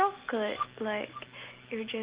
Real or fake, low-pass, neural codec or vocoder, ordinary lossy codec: real; 3.6 kHz; none; Opus, 16 kbps